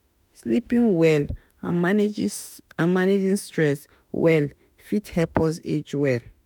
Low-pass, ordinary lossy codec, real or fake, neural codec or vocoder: none; none; fake; autoencoder, 48 kHz, 32 numbers a frame, DAC-VAE, trained on Japanese speech